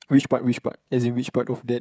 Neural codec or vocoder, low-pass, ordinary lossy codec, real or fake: codec, 16 kHz, 16 kbps, FreqCodec, smaller model; none; none; fake